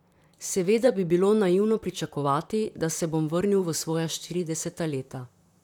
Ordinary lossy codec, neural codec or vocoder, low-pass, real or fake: none; vocoder, 44.1 kHz, 128 mel bands, Pupu-Vocoder; 19.8 kHz; fake